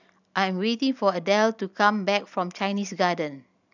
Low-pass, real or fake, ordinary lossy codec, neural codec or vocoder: 7.2 kHz; real; none; none